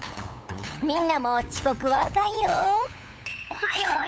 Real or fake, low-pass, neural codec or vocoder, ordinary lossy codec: fake; none; codec, 16 kHz, 8 kbps, FunCodec, trained on LibriTTS, 25 frames a second; none